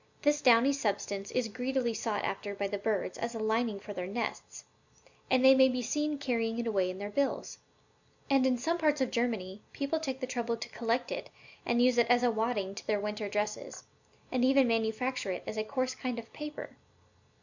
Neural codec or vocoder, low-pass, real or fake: none; 7.2 kHz; real